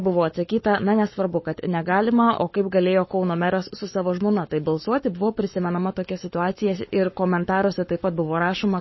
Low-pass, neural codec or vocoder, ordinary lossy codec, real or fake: 7.2 kHz; codec, 44.1 kHz, 7.8 kbps, Pupu-Codec; MP3, 24 kbps; fake